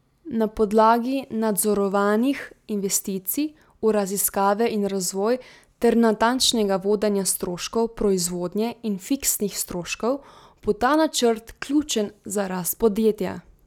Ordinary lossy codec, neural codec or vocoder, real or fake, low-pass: none; none; real; 19.8 kHz